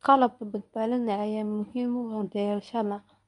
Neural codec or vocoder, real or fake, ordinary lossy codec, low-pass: codec, 24 kHz, 0.9 kbps, WavTokenizer, medium speech release version 1; fake; none; 10.8 kHz